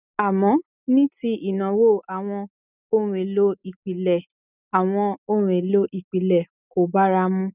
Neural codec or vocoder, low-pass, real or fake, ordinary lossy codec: none; 3.6 kHz; real; none